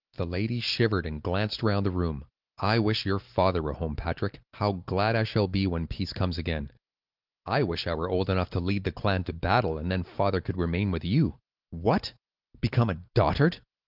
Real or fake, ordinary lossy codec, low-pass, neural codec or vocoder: real; Opus, 32 kbps; 5.4 kHz; none